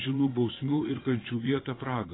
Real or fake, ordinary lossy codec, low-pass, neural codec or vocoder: fake; AAC, 16 kbps; 7.2 kHz; vocoder, 22.05 kHz, 80 mel bands, Vocos